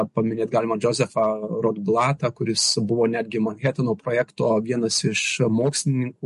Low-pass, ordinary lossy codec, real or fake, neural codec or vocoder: 14.4 kHz; MP3, 48 kbps; real; none